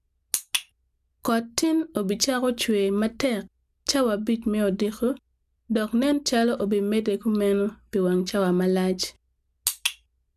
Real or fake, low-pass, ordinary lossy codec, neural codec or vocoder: real; 14.4 kHz; none; none